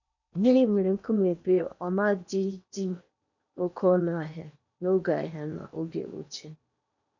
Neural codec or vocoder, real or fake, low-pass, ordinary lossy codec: codec, 16 kHz in and 24 kHz out, 0.8 kbps, FocalCodec, streaming, 65536 codes; fake; 7.2 kHz; none